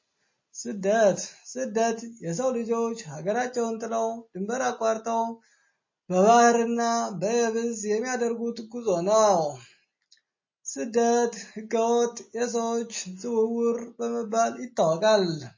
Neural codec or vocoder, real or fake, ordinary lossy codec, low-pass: none; real; MP3, 32 kbps; 7.2 kHz